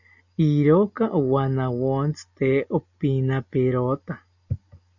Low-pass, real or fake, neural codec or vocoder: 7.2 kHz; real; none